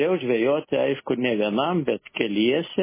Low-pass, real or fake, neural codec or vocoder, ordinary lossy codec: 3.6 kHz; real; none; MP3, 16 kbps